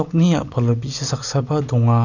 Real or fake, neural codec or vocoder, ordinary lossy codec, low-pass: real; none; none; 7.2 kHz